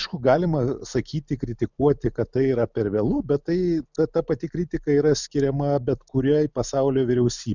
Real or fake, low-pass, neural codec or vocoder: real; 7.2 kHz; none